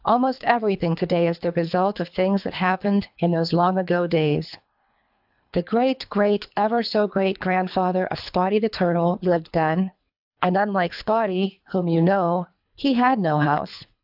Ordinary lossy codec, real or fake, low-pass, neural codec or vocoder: AAC, 48 kbps; fake; 5.4 kHz; codec, 24 kHz, 3 kbps, HILCodec